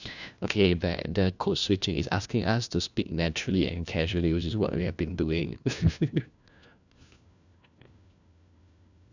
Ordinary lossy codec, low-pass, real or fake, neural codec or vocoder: none; 7.2 kHz; fake; codec, 16 kHz, 1 kbps, FunCodec, trained on LibriTTS, 50 frames a second